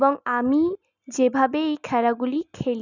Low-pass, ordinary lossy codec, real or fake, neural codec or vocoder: 7.2 kHz; none; real; none